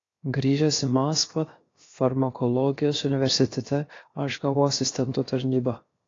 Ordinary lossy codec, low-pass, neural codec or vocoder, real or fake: AAC, 32 kbps; 7.2 kHz; codec, 16 kHz, 0.7 kbps, FocalCodec; fake